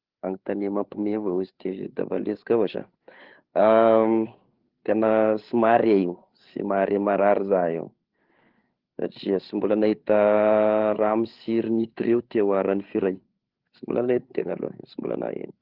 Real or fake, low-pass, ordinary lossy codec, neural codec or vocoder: fake; 5.4 kHz; Opus, 16 kbps; codec, 16 kHz, 8 kbps, FreqCodec, larger model